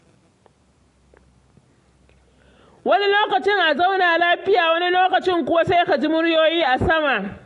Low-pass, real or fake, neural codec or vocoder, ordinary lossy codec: 10.8 kHz; real; none; AAC, 48 kbps